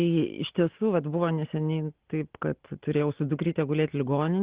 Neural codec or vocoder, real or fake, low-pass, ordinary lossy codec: none; real; 3.6 kHz; Opus, 16 kbps